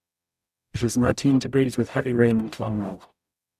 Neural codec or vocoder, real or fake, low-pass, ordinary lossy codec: codec, 44.1 kHz, 0.9 kbps, DAC; fake; 14.4 kHz; none